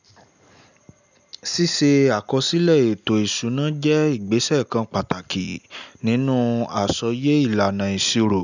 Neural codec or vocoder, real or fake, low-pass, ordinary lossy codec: none; real; 7.2 kHz; none